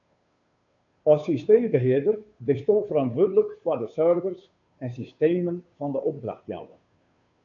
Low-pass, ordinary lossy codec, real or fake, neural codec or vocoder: 7.2 kHz; AAC, 64 kbps; fake; codec, 16 kHz, 2 kbps, FunCodec, trained on Chinese and English, 25 frames a second